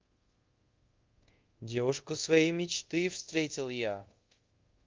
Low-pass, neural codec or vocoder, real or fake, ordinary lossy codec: 7.2 kHz; codec, 24 kHz, 0.5 kbps, DualCodec; fake; Opus, 32 kbps